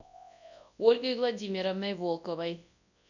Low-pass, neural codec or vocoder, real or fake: 7.2 kHz; codec, 24 kHz, 0.9 kbps, WavTokenizer, large speech release; fake